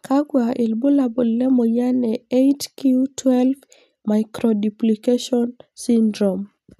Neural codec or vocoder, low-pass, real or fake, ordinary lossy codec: none; 14.4 kHz; real; none